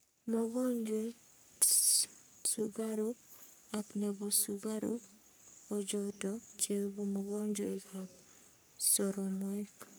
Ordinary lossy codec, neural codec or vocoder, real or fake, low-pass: none; codec, 44.1 kHz, 3.4 kbps, Pupu-Codec; fake; none